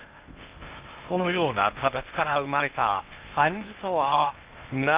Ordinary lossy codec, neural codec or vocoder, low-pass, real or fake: Opus, 24 kbps; codec, 16 kHz in and 24 kHz out, 0.6 kbps, FocalCodec, streaming, 2048 codes; 3.6 kHz; fake